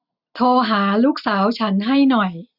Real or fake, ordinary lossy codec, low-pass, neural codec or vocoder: real; none; 5.4 kHz; none